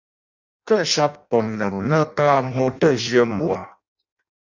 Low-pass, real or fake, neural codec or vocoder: 7.2 kHz; fake; codec, 16 kHz in and 24 kHz out, 0.6 kbps, FireRedTTS-2 codec